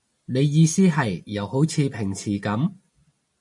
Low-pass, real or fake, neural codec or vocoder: 10.8 kHz; real; none